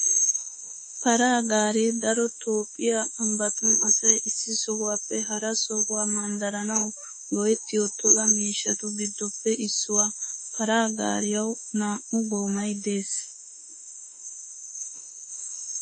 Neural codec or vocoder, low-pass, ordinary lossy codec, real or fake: autoencoder, 48 kHz, 32 numbers a frame, DAC-VAE, trained on Japanese speech; 9.9 kHz; MP3, 32 kbps; fake